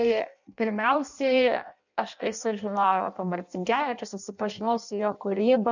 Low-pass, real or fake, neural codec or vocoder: 7.2 kHz; fake; codec, 16 kHz in and 24 kHz out, 0.6 kbps, FireRedTTS-2 codec